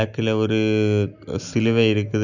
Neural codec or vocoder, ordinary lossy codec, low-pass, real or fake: none; none; 7.2 kHz; real